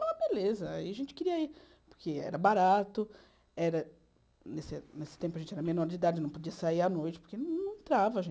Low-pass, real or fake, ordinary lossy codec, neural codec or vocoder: none; real; none; none